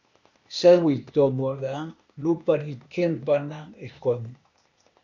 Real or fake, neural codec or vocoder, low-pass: fake; codec, 16 kHz, 0.8 kbps, ZipCodec; 7.2 kHz